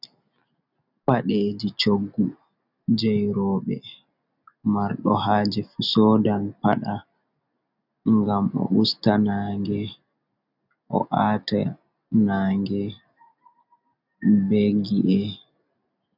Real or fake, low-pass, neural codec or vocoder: real; 5.4 kHz; none